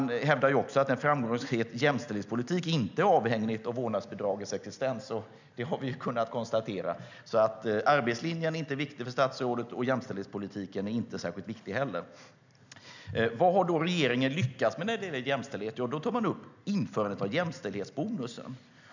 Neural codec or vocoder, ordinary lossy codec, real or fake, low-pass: none; none; real; 7.2 kHz